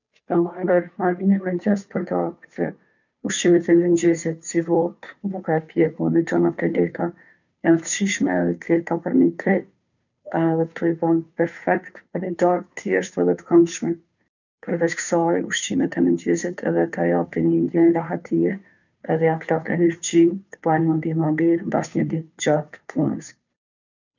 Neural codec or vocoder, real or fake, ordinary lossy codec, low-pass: codec, 16 kHz, 2 kbps, FunCodec, trained on Chinese and English, 25 frames a second; fake; none; 7.2 kHz